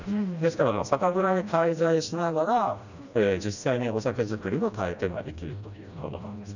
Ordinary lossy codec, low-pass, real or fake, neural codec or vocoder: none; 7.2 kHz; fake; codec, 16 kHz, 1 kbps, FreqCodec, smaller model